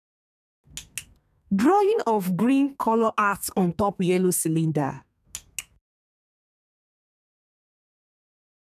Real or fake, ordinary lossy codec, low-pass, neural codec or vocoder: fake; none; 14.4 kHz; codec, 32 kHz, 1.9 kbps, SNAC